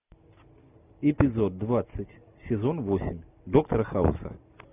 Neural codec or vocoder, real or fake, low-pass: none; real; 3.6 kHz